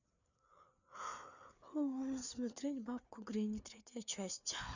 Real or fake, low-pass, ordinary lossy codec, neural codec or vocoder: fake; 7.2 kHz; none; codec, 16 kHz, 4 kbps, FreqCodec, larger model